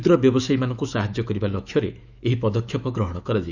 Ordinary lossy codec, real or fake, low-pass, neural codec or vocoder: none; fake; 7.2 kHz; autoencoder, 48 kHz, 128 numbers a frame, DAC-VAE, trained on Japanese speech